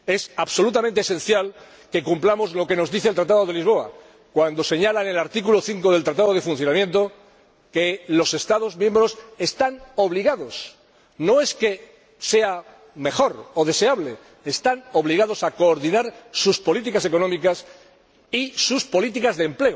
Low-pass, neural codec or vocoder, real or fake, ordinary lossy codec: none; none; real; none